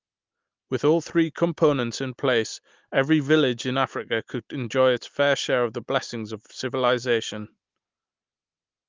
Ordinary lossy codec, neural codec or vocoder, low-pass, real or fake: Opus, 32 kbps; none; 7.2 kHz; real